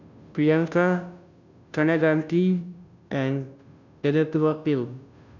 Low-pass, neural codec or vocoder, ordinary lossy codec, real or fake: 7.2 kHz; codec, 16 kHz, 0.5 kbps, FunCodec, trained on Chinese and English, 25 frames a second; none; fake